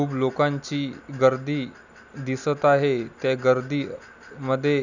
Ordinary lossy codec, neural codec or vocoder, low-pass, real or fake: none; none; 7.2 kHz; real